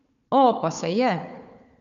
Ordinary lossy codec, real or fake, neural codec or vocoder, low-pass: none; fake; codec, 16 kHz, 4 kbps, FunCodec, trained on Chinese and English, 50 frames a second; 7.2 kHz